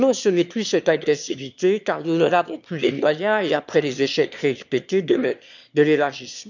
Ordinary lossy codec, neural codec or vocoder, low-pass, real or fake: none; autoencoder, 22.05 kHz, a latent of 192 numbers a frame, VITS, trained on one speaker; 7.2 kHz; fake